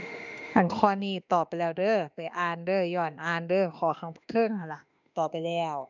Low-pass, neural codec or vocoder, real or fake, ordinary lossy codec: 7.2 kHz; autoencoder, 48 kHz, 32 numbers a frame, DAC-VAE, trained on Japanese speech; fake; none